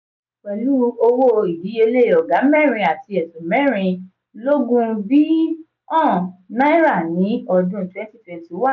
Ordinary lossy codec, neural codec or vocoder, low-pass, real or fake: none; none; 7.2 kHz; real